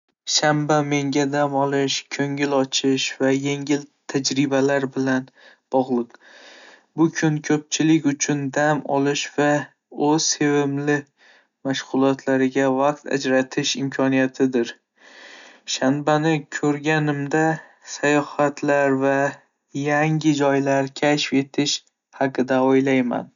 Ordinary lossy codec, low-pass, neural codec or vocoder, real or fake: none; 7.2 kHz; none; real